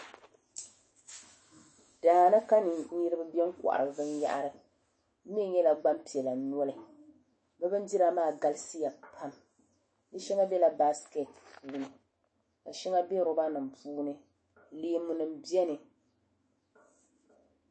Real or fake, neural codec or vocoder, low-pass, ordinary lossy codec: fake; autoencoder, 48 kHz, 128 numbers a frame, DAC-VAE, trained on Japanese speech; 9.9 kHz; MP3, 32 kbps